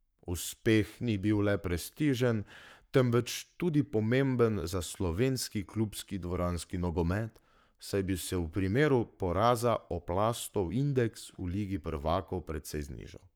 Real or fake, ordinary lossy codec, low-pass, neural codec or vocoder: fake; none; none; codec, 44.1 kHz, 7.8 kbps, Pupu-Codec